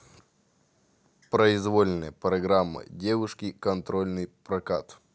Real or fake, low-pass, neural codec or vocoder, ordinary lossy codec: real; none; none; none